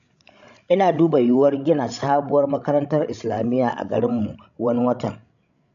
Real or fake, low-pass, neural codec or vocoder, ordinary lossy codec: fake; 7.2 kHz; codec, 16 kHz, 16 kbps, FreqCodec, larger model; none